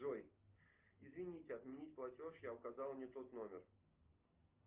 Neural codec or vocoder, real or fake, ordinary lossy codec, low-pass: none; real; Opus, 32 kbps; 3.6 kHz